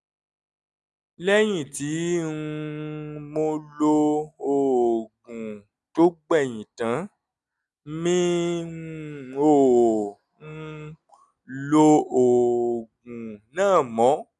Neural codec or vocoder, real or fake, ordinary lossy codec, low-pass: none; real; none; none